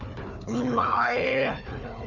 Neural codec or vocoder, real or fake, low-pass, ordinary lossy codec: codec, 16 kHz, 4 kbps, FunCodec, trained on Chinese and English, 50 frames a second; fake; 7.2 kHz; none